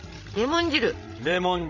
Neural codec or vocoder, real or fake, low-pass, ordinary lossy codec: codec, 16 kHz, 16 kbps, FreqCodec, larger model; fake; 7.2 kHz; none